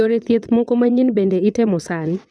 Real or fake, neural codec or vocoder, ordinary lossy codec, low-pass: fake; vocoder, 22.05 kHz, 80 mel bands, WaveNeXt; none; none